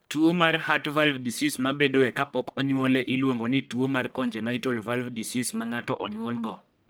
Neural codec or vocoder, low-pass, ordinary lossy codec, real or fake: codec, 44.1 kHz, 1.7 kbps, Pupu-Codec; none; none; fake